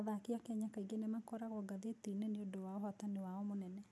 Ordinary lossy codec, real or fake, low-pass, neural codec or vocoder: none; real; none; none